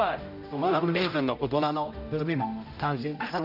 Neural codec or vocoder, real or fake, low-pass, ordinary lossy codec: codec, 16 kHz, 0.5 kbps, X-Codec, HuBERT features, trained on general audio; fake; 5.4 kHz; none